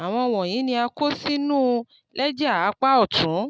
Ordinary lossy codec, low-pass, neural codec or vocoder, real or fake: none; none; none; real